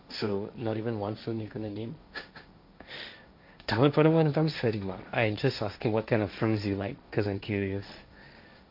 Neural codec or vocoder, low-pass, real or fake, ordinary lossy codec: codec, 16 kHz, 1.1 kbps, Voila-Tokenizer; 5.4 kHz; fake; MP3, 48 kbps